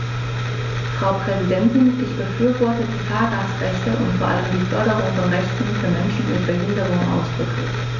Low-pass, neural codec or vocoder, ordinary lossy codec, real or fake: 7.2 kHz; none; none; real